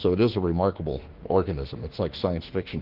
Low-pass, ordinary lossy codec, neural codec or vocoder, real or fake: 5.4 kHz; Opus, 16 kbps; autoencoder, 48 kHz, 32 numbers a frame, DAC-VAE, trained on Japanese speech; fake